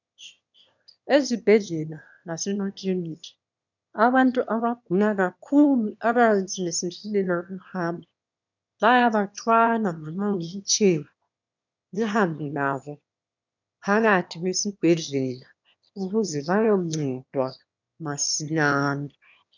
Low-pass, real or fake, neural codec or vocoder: 7.2 kHz; fake; autoencoder, 22.05 kHz, a latent of 192 numbers a frame, VITS, trained on one speaker